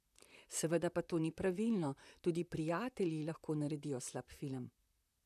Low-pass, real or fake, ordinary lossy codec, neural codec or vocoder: 14.4 kHz; fake; none; vocoder, 44.1 kHz, 128 mel bands, Pupu-Vocoder